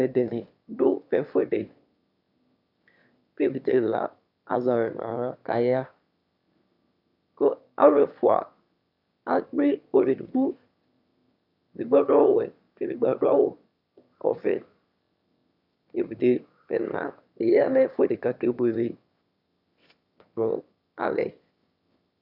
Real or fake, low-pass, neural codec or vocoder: fake; 5.4 kHz; autoencoder, 22.05 kHz, a latent of 192 numbers a frame, VITS, trained on one speaker